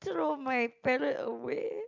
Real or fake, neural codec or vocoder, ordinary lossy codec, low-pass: real; none; MP3, 64 kbps; 7.2 kHz